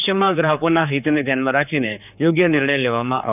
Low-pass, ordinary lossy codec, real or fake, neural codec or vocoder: 3.6 kHz; none; fake; codec, 16 kHz, 4 kbps, X-Codec, HuBERT features, trained on general audio